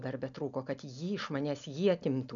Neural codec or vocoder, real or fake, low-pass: none; real; 7.2 kHz